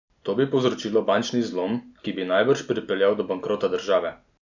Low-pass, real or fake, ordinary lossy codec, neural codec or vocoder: 7.2 kHz; real; none; none